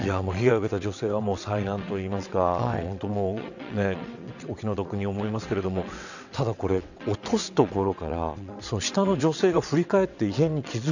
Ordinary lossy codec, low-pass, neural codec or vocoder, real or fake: none; 7.2 kHz; vocoder, 22.05 kHz, 80 mel bands, WaveNeXt; fake